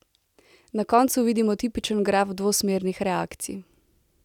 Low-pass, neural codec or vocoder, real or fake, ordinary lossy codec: 19.8 kHz; none; real; none